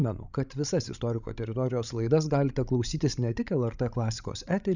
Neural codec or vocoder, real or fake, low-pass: codec, 16 kHz, 16 kbps, FreqCodec, larger model; fake; 7.2 kHz